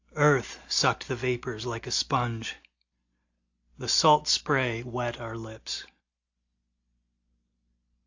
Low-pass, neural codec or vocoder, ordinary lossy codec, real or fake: 7.2 kHz; none; AAC, 48 kbps; real